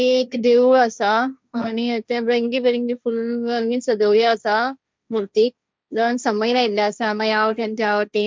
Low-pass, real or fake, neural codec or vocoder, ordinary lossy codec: none; fake; codec, 16 kHz, 1.1 kbps, Voila-Tokenizer; none